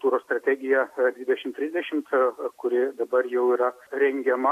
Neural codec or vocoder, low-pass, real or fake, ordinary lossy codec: none; 14.4 kHz; real; AAC, 64 kbps